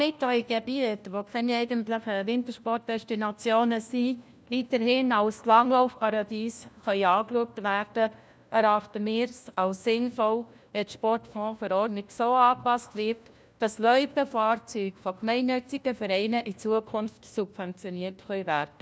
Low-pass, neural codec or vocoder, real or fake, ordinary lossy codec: none; codec, 16 kHz, 1 kbps, FunCodec, trained on LibriTTS, 50 frames a second; fake; none